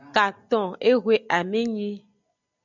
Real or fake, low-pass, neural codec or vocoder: real; 7.2 kHz; none